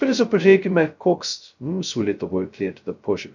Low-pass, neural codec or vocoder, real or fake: 7.2 kHz; codec, 16 kHz, 0.2 kbps, FocalCodec; fake